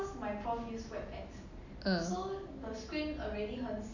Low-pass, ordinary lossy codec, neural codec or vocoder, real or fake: 7.2 kHz; none; none; real